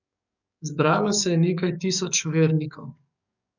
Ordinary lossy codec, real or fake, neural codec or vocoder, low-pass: none; fake; codec, 44.1 kHz, 7.8 kbps, DAC; 7.2 kHz